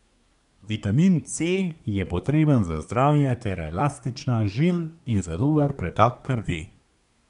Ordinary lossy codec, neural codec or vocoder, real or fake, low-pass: none; codec, 24 kHz, 1 kbps, SNAC; fake; 10.8 kHz